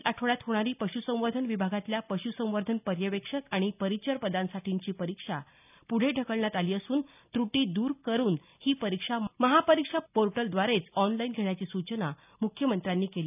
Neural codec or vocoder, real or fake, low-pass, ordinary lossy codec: none; real; 3.6 kHz; none